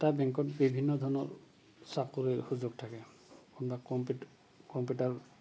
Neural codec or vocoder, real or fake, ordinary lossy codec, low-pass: none; real; none; none